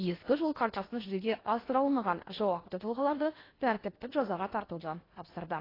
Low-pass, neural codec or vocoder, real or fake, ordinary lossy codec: 5.4 kHz; codec, 16 kHz in and 24 kHz out, 0.8 kbps, FocalCodec, streaming, 65536 codes; fake; AAC, 24 kbps